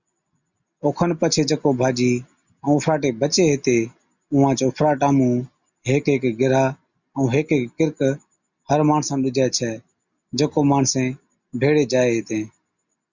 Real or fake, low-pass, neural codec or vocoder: real; 7.2 kHz; none